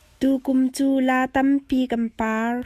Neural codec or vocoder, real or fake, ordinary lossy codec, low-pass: autoencoder, 48 kHz, 128 numbers a frame, DAC-VAE, trained on Japanese speech; fake; Opus, 64 kbps; 14.4 kHz